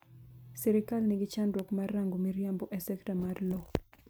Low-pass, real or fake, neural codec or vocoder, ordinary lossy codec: none; fake; vocoder, 44.1 kHz, 128 mel bands every 256 samples, BigVGAN v2; none